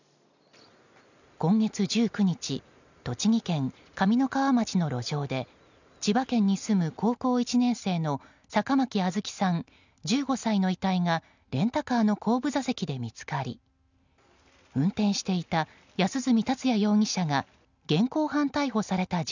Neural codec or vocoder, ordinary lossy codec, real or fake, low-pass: none; none; real; 7.2 kHz